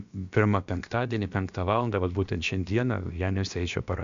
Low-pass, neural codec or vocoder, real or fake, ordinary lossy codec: 7.2 kHz; codec, 16 kHz, about 1 kbps, DyCAST, with the encoder's durations; fake; MP3, 64 kbps